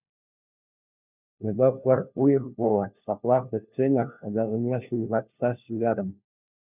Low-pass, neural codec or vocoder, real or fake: 3.6 kHz; codec, 16 kHz, 1 kbps, FunCodec, trained on LibriTTS, 50 frames a second; fake